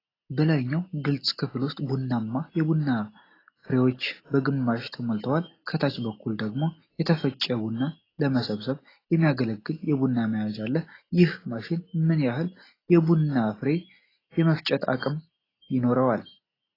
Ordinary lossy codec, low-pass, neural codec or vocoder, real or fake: AAC, 24 kbps; 5.4 kHz; none; real